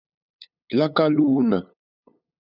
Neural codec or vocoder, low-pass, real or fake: codec, 16 kHz, 8 kbps, FunCodec, trained on LibriTTS, 25 frames a second; 5.4 kHz; fake